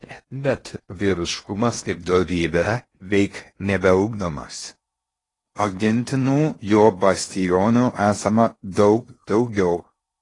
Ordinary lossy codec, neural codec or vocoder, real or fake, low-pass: AAC, 32 kbps; codec, 16 kHz in and 24 kHz out, 0.6 kbps, FocalCodec, streaming, 4096 codes; fake; 10.8 kHz